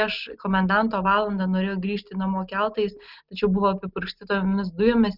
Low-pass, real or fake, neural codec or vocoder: 5.4 kHz; real; none